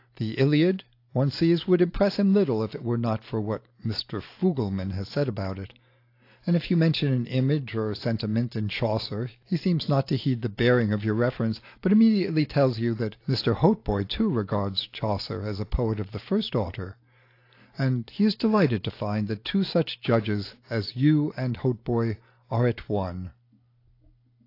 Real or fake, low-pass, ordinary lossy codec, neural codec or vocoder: real; 5.4 kHz; AAC, 32 kbps; none